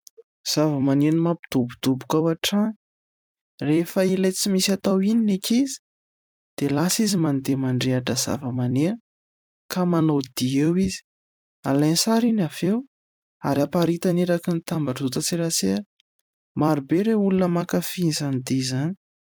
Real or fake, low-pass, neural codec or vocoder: fake; 19.8 kHz; vocoder, 44.1 kHz, 128 mel bands every 256 samples, BigVGAN v2